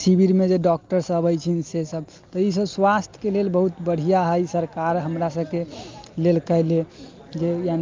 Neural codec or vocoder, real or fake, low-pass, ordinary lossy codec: none; real; 7.2 kHz; Opus, 24 kbps